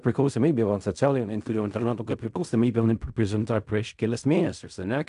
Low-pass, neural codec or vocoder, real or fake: 10.8 kHz; codec, 16 kHz in and 24 kHz out, 0.4 kbps, LongCat-Audio-Codec, fine tuned four codebook decoder; fake